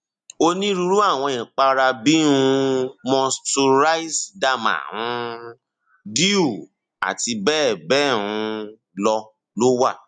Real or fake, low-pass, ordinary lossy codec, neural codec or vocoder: real; 9.9 kHz; none; none